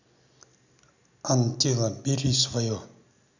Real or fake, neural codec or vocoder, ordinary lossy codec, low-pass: real; none; none; 7.2 kHz